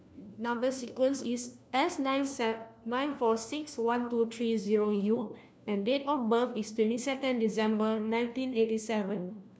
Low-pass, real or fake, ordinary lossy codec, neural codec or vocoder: none; fake; none; codec, 16 kHz, 1 kbps, FunCodec, trained on LibriTTS, 50 frames a second